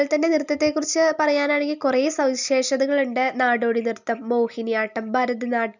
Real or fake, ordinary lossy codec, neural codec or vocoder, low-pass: real; none; none; 7.2 kHz